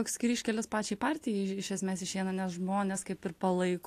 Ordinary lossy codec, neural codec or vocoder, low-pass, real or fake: AAC, 64 kbps; none; 14.4 kHz; real